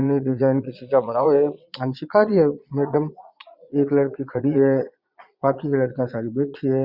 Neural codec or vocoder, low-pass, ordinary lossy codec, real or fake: vocoder, 22.05 kHz, 80 mel bands, Vocos; 5.4 kHz; Opus, 64 kbps; fake